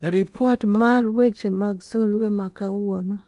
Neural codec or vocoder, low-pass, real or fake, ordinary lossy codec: codec, 16 kHz in and 24 kHz out, 0.8 kbps, FocalCodec, streaming, 65536 codes; 10.8 kHz; fake; none